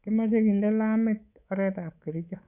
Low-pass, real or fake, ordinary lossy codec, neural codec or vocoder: 3.6 kHz; fake; none; codec, 24 kHz, 3.1 kbps, DualCodec